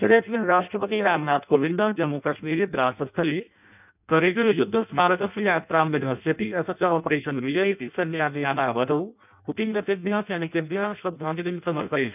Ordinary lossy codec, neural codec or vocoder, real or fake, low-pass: none; codec, 16 kHz in and 24 kHz out, 0.6 kbps, FireRedTTS-2 codec; fake; 3.6 kHz